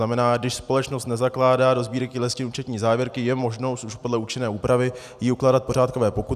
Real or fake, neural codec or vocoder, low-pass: real; none; 14.4 kHz